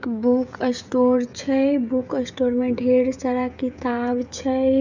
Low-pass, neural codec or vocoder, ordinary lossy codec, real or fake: 7.2 kHz; codec, 16 kHz, 16 kbps, FreqCodec, smaller model; none; fake